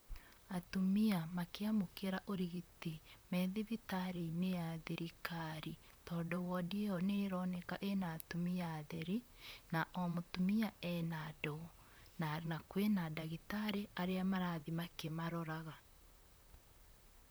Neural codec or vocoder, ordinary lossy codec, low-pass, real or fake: vocoder, 44.1 kHz, 128 mel bands, Pupu-Vocoder; none; none; fake